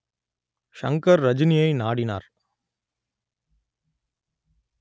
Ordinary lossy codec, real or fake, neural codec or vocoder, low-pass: none; real; none; none